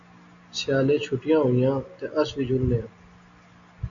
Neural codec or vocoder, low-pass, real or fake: none; 7.2 kHz; real